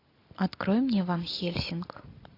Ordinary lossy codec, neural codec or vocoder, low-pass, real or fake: AAC, 32 kbps; none; 5.4 kHz; real